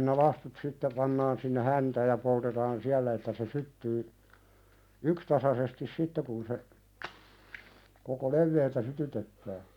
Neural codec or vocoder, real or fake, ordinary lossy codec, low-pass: none; real; none; 19.8 kHz